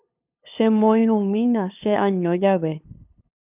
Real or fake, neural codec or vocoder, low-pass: fake; codec, 16 kHz, 2 kbps, FunCodec, trained on LibriTTS, 25 frames a second; 3.6 kHz